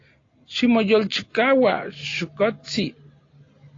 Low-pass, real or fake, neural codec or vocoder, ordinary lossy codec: 7.2 kHz; real; none; AAC, 32 kbps